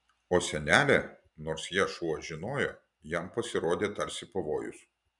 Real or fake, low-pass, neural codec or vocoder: real; 10.8 kHz; none